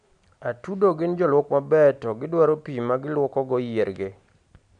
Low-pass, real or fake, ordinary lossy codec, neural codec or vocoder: 9.9 kHz; real; none; none